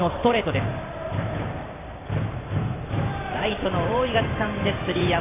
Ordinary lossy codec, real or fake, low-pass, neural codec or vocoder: AAC, 16 kbps; fake; 3.6 kHz; vocoder, 44.1 kHz, 128 mel bands every 512 samples, BigVGAN v2